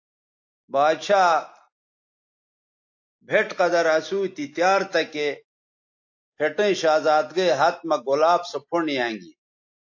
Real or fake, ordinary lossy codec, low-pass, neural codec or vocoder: real; AAC, 48 kbps; 7.2 kHz; none